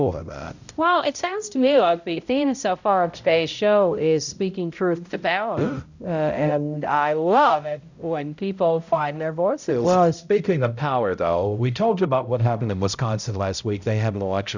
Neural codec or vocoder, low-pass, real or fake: codec, 16 kHz, 0.5 kbps, X-Codec, HuBERT features, trained on balanced general audio; 7.2 kHz; fake